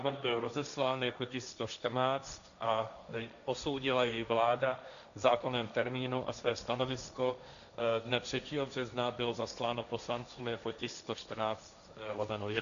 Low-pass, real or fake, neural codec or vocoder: 7.2 kHz; fake; codec, 16 kHz, 1.1 kbps, Voila-Tokenizer